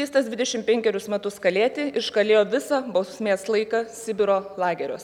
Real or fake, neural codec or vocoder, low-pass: real; none; 19.8 kHz